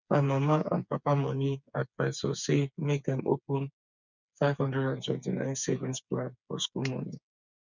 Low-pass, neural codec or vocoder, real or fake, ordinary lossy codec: 7.2 kHz; codec, 16 kHz, 4 kbps, FreqCodec, smaller model; fake; none